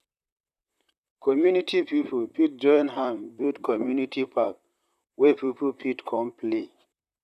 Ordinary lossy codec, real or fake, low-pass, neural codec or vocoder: none; fake; 14.4 kHz; vocoder, 44.1 kHz, 128 mel bands, Pupu-Vocoder